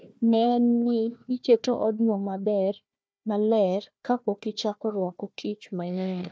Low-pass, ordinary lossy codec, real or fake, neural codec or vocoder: none; none; fake; codec, 16 kHz, 1 kbps, FunCodec, trained on Chinese and English, 50 frames a second